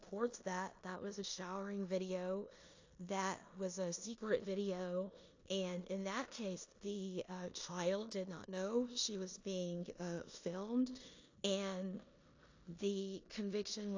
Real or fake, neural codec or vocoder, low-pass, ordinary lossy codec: fake; codec, 16 kHz in and 24 kHz out, 0.9 kbps, LongCat-Audio-Codec, four codebook decoder; 7.2 kHz; AAC, 48 kbps